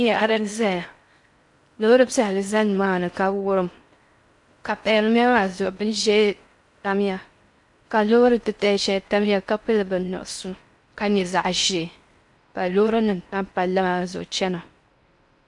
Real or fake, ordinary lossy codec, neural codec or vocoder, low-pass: fake; MP3, 64 kbps; codec, 16 kHz in and 24 kHz out, 0.6 kbps, FocalCodec, streaming, 4096 codes; 10.8 kHz